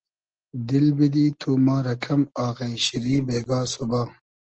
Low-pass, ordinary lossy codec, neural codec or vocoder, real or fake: 7.2 kHz; Opus, 16 kbps; none; real